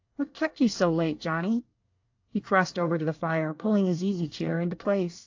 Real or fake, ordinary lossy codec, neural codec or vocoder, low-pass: fake; AAC, 48 kbps; codec, 24 kHz, 1 kbps, SNAC; 7.2 kHz